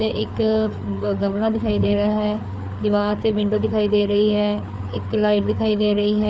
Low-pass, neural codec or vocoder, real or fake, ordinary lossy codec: none; codec, 16 kHz, 4 kbps, FreqCodec, larger model; fake; none